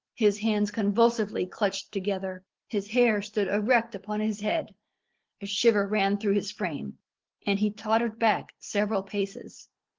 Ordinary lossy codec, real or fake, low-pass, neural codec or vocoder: Opus, 16 kbps; real; 7.2 kHz; none